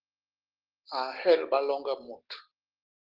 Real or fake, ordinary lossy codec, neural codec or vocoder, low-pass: real; Opus, 16 kbps; none; 5.4 kHz